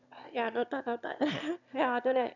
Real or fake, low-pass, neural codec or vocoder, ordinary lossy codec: fake; 7.2 kHz; autoencoder, 22.05 kHz, a latent of 192 numbers a frame, VITS, trained on one speaker; none